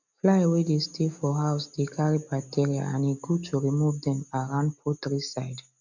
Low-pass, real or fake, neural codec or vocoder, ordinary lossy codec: 7.2 kHz; real; none; none